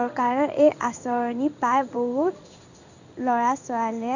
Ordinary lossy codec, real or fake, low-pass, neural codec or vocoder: none; fake; 7.2 kHz; codec, 16 kHz in and 24 kHz out, 1 kbps, XY-Tokenizer